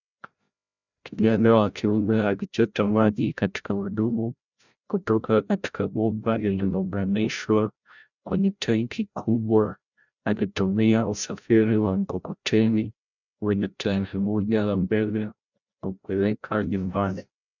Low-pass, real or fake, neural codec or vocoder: 7.2 kHz; fake; codec, 16 kHz, 0.5 kbps, FreqCodec, larger model